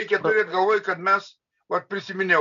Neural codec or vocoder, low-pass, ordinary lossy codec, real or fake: none; 7.2 kHz; MP3, 96 kbps; real